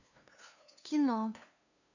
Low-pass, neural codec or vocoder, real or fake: 7.2 kHz; codec, 16 kHz, 2 kbps, FunCodec, trained on LibriTTS, 25 frames a second; fake